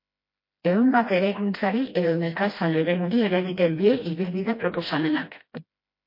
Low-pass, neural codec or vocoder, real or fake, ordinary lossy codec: 5.4 kHz; codec, 16 kHz, 1 kbps, FreqCodec, smaller model; fake; MP3, 32 kbps